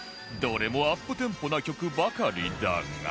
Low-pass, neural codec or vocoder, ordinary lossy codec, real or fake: none; none; none; real